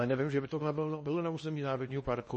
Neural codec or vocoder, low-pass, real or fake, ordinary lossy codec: codec, 16 kHz in and 24 kHz out, 0.8 kbps, FocalCodec, streaming, 65536 codes; 10.8 kHz; fake; MP3, 32 kbps